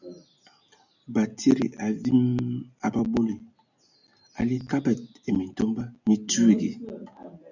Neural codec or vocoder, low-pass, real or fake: none; 7.2 kHz; real